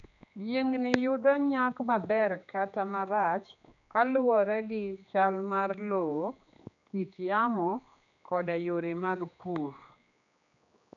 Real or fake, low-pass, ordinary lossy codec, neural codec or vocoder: fake; 7.2 kHz; none; codec, 16 kHz, 2 kbps, X-Codec, HuBERT features, trained on general audio